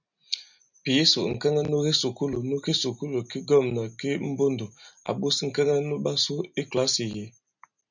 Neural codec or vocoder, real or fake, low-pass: none; real; 7.2 kHz